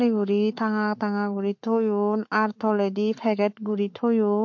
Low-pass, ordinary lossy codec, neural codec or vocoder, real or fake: 7.2 kHz; MP3, 48 kbps; codec, 44.1 kHz, 7.8 kbps, Pupu-Codec; fake